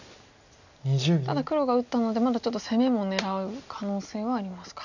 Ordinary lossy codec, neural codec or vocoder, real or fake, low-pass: none; none; real; 7.2 kHz